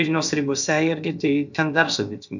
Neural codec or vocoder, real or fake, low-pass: codec, 16 kHz, about 1 kbps, DyCAST, with the encoder's durations; fake; 7.2 kHz